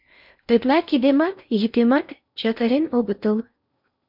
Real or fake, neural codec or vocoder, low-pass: fake; codec, 16 kHz in and 24 kHz out, 0.6 kbps, FocalCodec, streaming, 4096 codes; 5.4 kHz